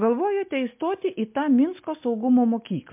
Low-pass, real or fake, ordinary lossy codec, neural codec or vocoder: 3.6 kHz; real; MP3, 32 kbps; none